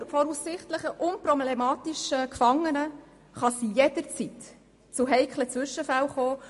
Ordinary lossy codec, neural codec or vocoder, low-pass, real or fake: MP3, 48 kbps; none; 14.4 kHz; real